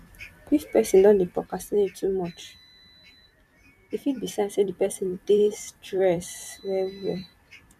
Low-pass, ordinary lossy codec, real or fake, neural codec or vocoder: 14.4 kHz; none; fake; vocoder, 44.1 kHz, 128 mel bands every 256 samples, BigVGAN v2